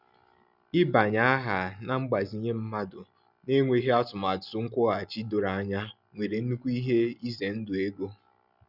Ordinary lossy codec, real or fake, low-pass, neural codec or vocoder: none; real; 5.4 kHz; none